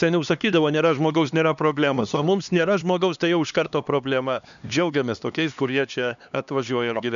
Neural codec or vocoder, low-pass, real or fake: codec, 16 kHz, 2 kbps, X-Codec, HuBERT features, trained on LibriSpeech; 7.2 kHz; fake